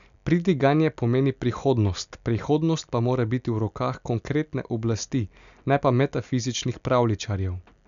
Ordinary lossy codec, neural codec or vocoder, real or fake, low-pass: none; none; real; 7.2 kHz